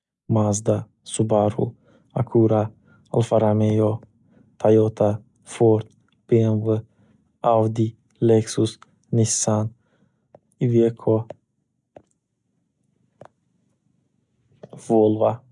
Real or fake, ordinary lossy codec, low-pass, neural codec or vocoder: real; none; 10.8 kHz; none